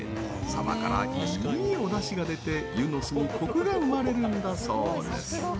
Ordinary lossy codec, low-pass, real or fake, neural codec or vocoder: none; none; real; none